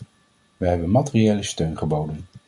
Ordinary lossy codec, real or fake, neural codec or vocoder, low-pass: MP3, 64 kbps; real; none; 10.8 kHz